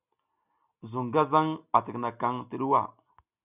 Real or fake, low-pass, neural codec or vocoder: real; 3.6 kHz; none